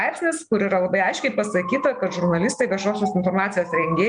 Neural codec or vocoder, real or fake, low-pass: none; real; 9.9 kHz